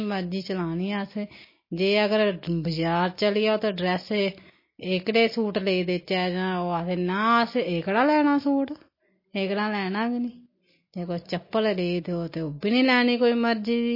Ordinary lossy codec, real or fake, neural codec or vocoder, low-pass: MP3, 24 kbps; real; none; 5.4 kHz